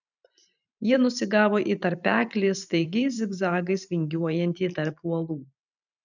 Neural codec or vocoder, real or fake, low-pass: vocoder, 44.1 kHz, 128 mel bands every 512 samples, BigVGAN v2; fake; 7.2 kHz